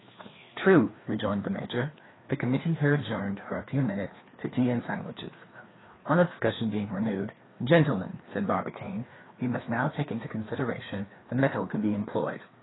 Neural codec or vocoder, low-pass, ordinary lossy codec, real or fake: codec, 16 kHz, 2 kbps, FreqCodec, larger model; 7.2 kHz; AAC, 16 kbps; fake